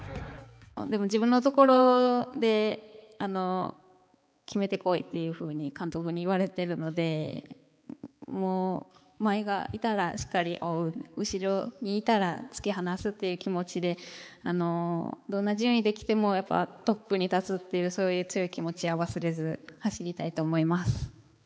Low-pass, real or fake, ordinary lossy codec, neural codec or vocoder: none; fake; none; codec, 16 kHz, 4 kbps, X-Codec, HuBERT features, trained on balanced general audio